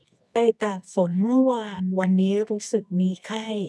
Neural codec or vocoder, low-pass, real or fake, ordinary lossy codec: codec, 24 kHz, 0.9 kbps, WavTokenizer, medium music audio release; none; fake; none